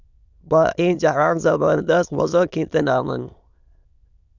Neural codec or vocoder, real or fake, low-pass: autoencoder, 22.05 kHz, a latent of 192 numbers a frame, VITS, trained on many speakers; fake; 7.2 kHz